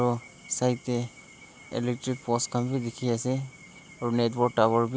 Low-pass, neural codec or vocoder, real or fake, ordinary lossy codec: none; none; real; none